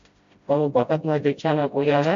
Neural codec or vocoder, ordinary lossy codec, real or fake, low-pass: codec, 16 kHz, 0.5 kbps, FreqCodec, smaller model; AAC, 64 kbps; fake; 7.2 kHz